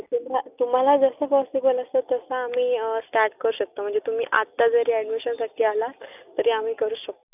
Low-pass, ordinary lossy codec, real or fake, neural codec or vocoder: 3.6 kHz; none; real; none